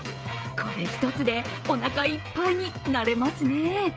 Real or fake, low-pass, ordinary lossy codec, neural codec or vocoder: fake; none; none; codec, 16 kHz, 16 kbps, FreqCodec, smaller model